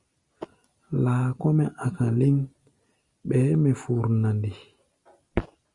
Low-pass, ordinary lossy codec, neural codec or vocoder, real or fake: 10.8 kHz; Opus, 64 kbps; none; real